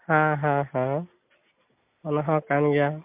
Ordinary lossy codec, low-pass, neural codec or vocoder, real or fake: MP3, 32 kbps; 3.6 kHz; none; real